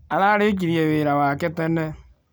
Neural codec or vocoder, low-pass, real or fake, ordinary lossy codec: none; none; real; none